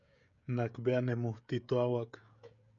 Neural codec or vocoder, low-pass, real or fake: codec, 16 kHz, 16 kbps, FreqCodec, smaller model; 7.2 kHz; fake